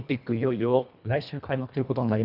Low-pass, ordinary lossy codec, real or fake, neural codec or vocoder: 5.4 kHz; none; fake; codec, 24 kHz, 1.5 kbps, HILCodec